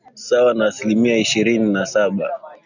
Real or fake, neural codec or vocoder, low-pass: real; none; 7.2 kHz